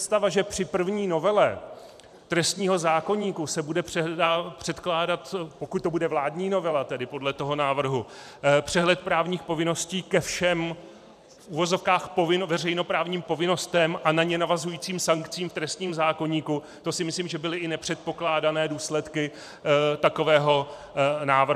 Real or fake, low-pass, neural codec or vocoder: fake; 14.4 kHz; vocoder, 44.1 kHz, 128 mel bands every 512 samples, BigVGAN v2